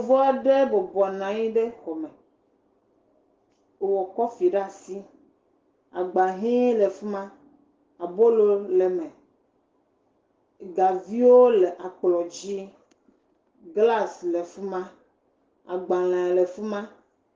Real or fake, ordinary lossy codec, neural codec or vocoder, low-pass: real; Opus, 16 kbps; none; 7.2 kHz